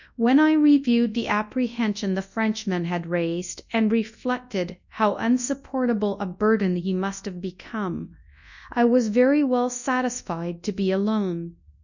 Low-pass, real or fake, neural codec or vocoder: 7.2 kHz; fake; codec, 24 kHz, 0.9 kbps, WavTokenizer, large speech release